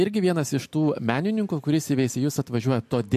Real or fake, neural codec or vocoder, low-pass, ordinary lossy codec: real; none; 14.4 kHz; MP3, 64 kbps